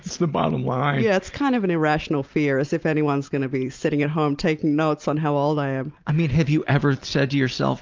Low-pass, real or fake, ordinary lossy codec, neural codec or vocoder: 7.2 kHz; real; Opus, 24 kbps; none